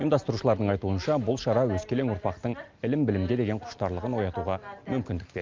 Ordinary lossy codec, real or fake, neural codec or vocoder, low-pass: Opus, 24 kbps; real; none; 7.2 kHz